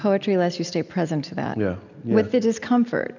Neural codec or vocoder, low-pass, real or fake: none; 7.2 kHz; real